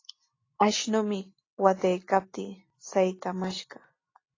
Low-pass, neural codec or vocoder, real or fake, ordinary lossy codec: 7.2 kHz; none; real; AAC, 32 kbps